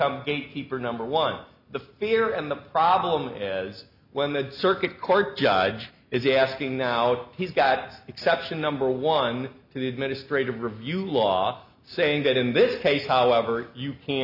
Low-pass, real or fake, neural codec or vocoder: 5.4 kHz; real; none